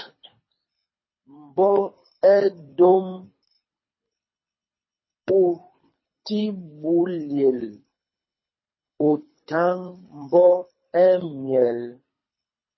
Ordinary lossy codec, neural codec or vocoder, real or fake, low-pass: MP3, 24 kbps; codec, 24 kHz, 3 kbps, HILCodec; fake; 7.2 kHz